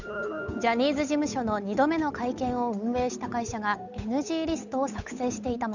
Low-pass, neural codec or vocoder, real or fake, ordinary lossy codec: 7.2 kHz; codec, 16 kHz, 8 kbps, FunCodec, trained on Chinese and English, 25 frames a second; fake; none